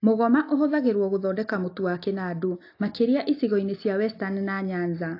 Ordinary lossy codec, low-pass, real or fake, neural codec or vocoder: AAC, 32 kbps; 5.4 kHz; real; none